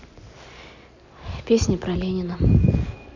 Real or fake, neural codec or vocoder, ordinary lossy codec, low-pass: real; none; none; 7.2 kHz